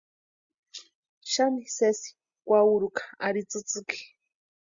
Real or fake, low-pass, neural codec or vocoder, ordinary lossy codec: real; 7.2 kHz; none; Opus, 64 kbps